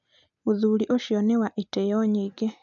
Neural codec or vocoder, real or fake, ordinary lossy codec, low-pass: none; real; none; 7.2 kHz